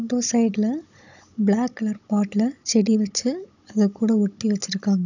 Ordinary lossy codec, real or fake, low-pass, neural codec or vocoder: none; fake; 7.2 kHz; codec, 16 kHz, 16 kbps, FunCodec, trained on Chinese and English, 50 frames a second